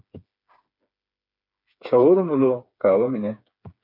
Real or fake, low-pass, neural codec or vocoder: fake; 5.4 kHz; codec, 16 kHz, 4 kbps, FreqCodec, smaller model